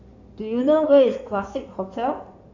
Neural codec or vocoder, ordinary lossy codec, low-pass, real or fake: codec, 16 kHz in and 24 kHz out, 2.2 kbps, FireRedTTS-2 codec; none; 7.2 kHz; fake